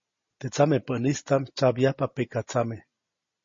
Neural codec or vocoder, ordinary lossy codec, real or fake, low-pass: none; MP3, 32 kbps; real; 7.2 kHz